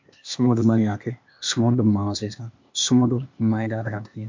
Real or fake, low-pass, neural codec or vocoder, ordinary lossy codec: fake; 7.2 kHz; codec, 16 kHz, 0.8 kbps, ZipCodec; MP3, 48 kbps